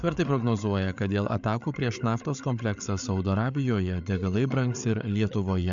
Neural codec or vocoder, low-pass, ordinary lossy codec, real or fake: codec, 16 kHz, 8 kbps, FreqCodec, larger model; 7.2 kHz; MP3, 64 kbps; fake